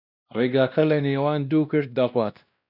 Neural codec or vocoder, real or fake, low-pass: codec, 16 kHz, 1 kbps, X-Codec, WavLM features, trained on Multilingual LibriSpeech; fake; 5.4 kHz